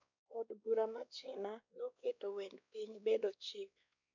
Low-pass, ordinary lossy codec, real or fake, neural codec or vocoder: 7.2 kHz; none; fake; codec, 16 kHz, 2 kbps, X-Codec, WavLM features, trained on Multilingual LibriSpeech